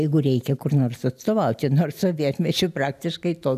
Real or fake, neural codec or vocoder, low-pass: real; none; 14.4 kHz